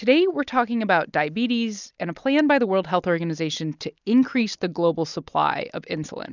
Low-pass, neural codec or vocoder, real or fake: 7.2 kHz; none; real